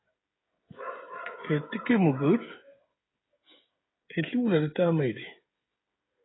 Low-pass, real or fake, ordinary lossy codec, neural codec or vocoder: 7.2 kHz; fake; AAC, 16 kbps; codec, 16 kHz, 16 kbps, FreqCodec, smaller model